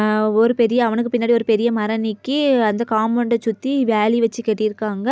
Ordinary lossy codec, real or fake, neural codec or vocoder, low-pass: none; real; none; none